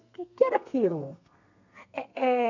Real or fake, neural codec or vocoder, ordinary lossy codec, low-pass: fake; codec, 32 kHz, 1.9 kbps, SNAC; none; 7.2 kHz